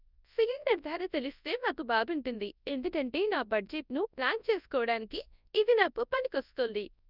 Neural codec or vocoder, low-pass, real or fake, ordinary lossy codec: codec, 24 kHz, 0.9 kbps, WavTokenizer, large speech release; 5.4 kHz; fake; none